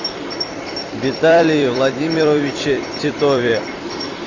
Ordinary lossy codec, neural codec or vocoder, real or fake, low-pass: Opus, 64 kbps; vocoder, 44.1 kHz, 128 mel bands every 256 samples, BigVGAN v2; fake; 7.2 kHz